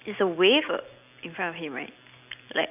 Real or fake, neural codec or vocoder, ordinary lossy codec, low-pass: real; none; none; 3.6 kHz